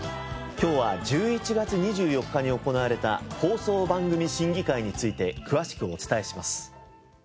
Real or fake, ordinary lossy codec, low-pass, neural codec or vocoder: real; none; none; none